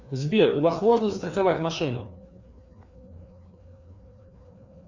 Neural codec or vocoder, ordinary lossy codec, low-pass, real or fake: codec, 16 kHz, 2 kbps, FreqCodec, larger model; Opus, 64 kbps; 7.2 kHz; fake